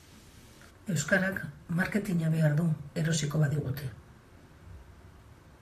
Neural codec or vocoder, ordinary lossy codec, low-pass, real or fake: vocoder, 44.1 kHz, 128 mel bands, Pupu-Vocoder; AAC, 64 kbps; 14.4 kHz; fake